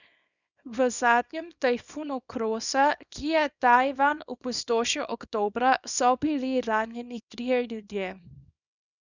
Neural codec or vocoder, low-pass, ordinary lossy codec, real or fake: codec, 24 kHz, 0.9 kbps, WavTokenizer, small release; 7.2 kHz; none; fake